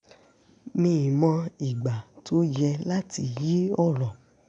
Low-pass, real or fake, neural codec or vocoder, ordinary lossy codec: 9.9 kHz; real; none; none